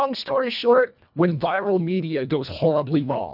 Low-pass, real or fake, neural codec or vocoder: 5.4 kHz; fake; codec, 24 kHz, 1.5 kbps, HILCodec